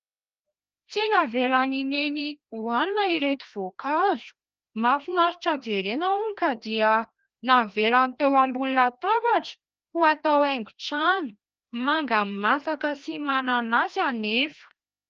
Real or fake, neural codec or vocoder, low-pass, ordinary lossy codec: fake; codec, 16 kHz, 1 kbps, FreqCodec, larger model; 7.2 kHz; Opus, 24 kbps